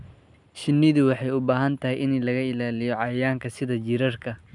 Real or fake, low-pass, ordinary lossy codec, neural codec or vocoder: real; 10.8 kHz; none; none